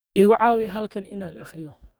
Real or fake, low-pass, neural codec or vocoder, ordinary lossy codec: fake; none; codec, 44.1 kHz, 2.6 kbps, DAC; none